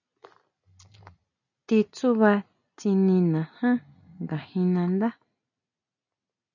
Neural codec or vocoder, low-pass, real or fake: none; 7.2 kHz; real